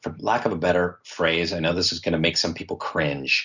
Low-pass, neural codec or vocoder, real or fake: 7.2 kHz; none; real